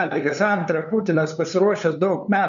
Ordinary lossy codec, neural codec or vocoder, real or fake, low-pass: MP3, 96 kbps; codec, 16 kHz, 2 kbps, FunCodec, trained on LibriTTS, 25 frames a second; fake; 7.2 kHz